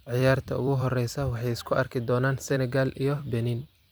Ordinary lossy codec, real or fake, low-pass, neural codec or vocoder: none; fake; none; vocoder, 44.1 kHz, 128 mel bands every 256 samples, BigVGAN v2